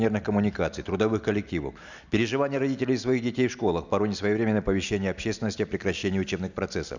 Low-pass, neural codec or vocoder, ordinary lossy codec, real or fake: 7.2 kHz; none; none; real